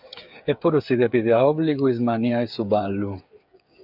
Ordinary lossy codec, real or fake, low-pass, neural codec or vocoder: AAC, 48 kbps; fake; 5.4 kHz; codec, 16 kHz, 8 kbps, FreqCodec, smaller model